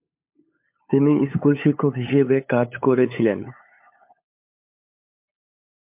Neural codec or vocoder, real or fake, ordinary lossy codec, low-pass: codec, 16 kHz, 8 kbps, FunCodec, trained on LibriTTS, 25 frames a second; fake; MP3, 32 kbps; 3.6 kHz